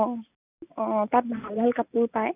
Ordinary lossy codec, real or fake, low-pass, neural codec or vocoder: none; real; 3.6 kHz; none